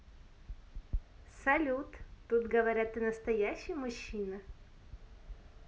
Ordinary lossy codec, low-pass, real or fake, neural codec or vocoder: none; none; real; none